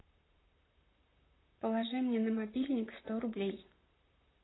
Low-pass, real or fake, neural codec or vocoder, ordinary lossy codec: 7.2 kHz; fake; vocoder, 44.1 kHz, 128 mel bands, Pupu-Vocoder; AAC, 16 kbps